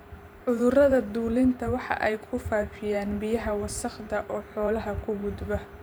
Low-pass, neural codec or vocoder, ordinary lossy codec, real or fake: none; vocoder, 44.1 kHz, 128 mel bands every 512 samples, BigVGAN v2; none; fake